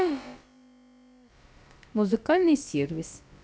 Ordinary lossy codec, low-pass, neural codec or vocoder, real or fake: none; none; codec, 16 kHz, about 1 kbps, DyCAST, with the encoder's durations; fake